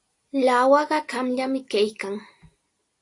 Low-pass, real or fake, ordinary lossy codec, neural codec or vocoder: 10.8 kHz; real; AAC, 48 kbps; none